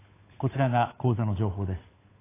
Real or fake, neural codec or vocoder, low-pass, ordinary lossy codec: fake; codec, 16 kHz, 8 kbps, FunCodec, trained on Chinese and English, 25 frames a second; 3.6 kHz; AAC, 16 kbps